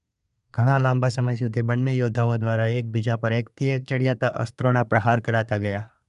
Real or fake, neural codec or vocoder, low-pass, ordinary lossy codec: fake; codec, 24 kHz, 1 kbps, SNAC; 10.8 kHz; none